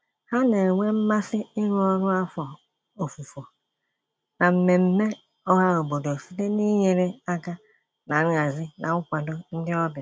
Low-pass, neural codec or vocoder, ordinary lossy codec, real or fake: none; none; none; real